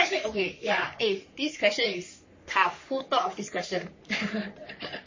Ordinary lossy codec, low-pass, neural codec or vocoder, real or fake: MP3, 32 kbps; 7.2 kHz; codec, 44.1 kHz, 3.4 kbps, Pupu-Codec; fake